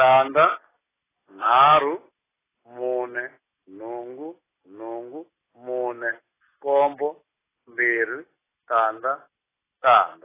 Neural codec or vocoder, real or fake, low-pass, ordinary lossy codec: none; real; 3.6 kHz; AAC, 16 kbps